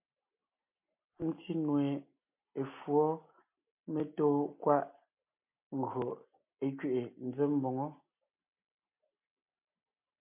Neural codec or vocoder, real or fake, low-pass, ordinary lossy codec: none; real; 3.6 kHz; MP3, 32 kbps